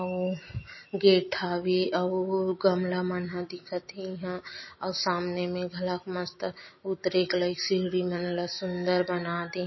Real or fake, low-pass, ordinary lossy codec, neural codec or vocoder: real; 7.2 kHz; MP3, 24 kbps; none